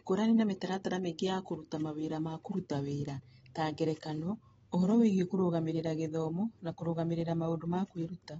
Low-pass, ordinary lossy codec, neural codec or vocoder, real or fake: 19.8 kHz; AAC, 24 kbps; none; real